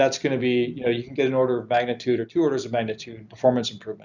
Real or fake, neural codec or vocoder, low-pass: real; none; 7.2 kHz